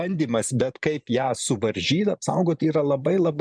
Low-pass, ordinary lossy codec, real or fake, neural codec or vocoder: 9.9 kHz; MP3, 96 kbps; fake; vocoder, 44.1 kHz, 128 mel bands every 512 samples, BigVGAN v2